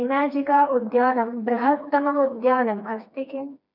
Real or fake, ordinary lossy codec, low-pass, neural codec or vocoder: fake; none; 5.4 kHz; codec, 16 kHz, 2 kbps, FreqCodec, smaller model